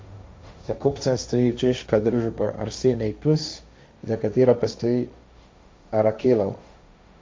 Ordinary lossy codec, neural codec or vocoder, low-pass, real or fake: none; codec, 16 kHz, 1.1 kbps, Voila-Tokenizer; none; fake